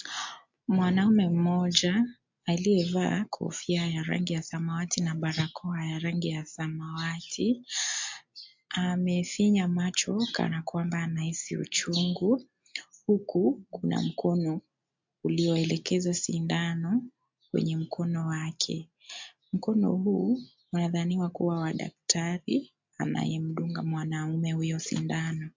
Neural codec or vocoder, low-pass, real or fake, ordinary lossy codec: none; 7.2 kHz; real; MP3, 48 kbps